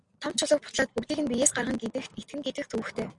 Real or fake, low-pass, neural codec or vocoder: real; 10.8 kHz; none